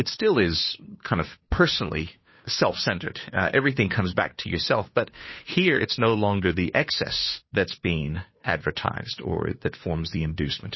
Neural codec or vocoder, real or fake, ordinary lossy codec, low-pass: codec, 16 kHz, 2 kbps, FunCodec, trained on Chinese and English, 25 frames a second; fake; MP3, 24 kbps; 7.2 kHz